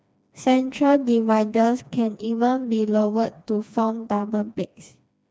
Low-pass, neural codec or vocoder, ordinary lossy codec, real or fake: none; codec, 16 kHz, 2 kbps, FreqCodec, smaller model; none; fake